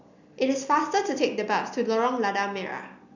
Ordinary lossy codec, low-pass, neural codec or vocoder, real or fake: none; 7.2 kHz; none; real